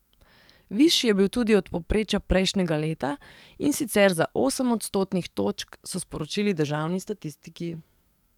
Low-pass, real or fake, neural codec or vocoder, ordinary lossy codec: 19.8 kHz; fake; codec, 44.1 kHz, 7.8 kbps, DAC; none